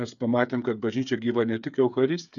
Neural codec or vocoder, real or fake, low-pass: codec, 16 kHz, 8 kbps, FreqCodec, smaller model; fake; 7.2 kHz